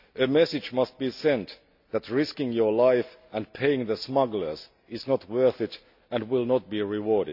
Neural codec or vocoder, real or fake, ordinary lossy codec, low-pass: none; real; none; 5.4 kHz